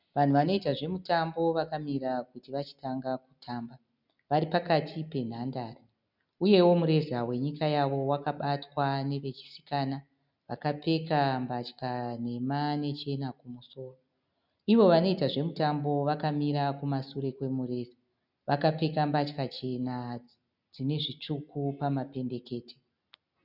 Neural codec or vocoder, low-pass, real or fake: none; 5.4 kHz; real